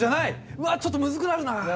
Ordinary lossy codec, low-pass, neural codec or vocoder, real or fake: none; none; none; real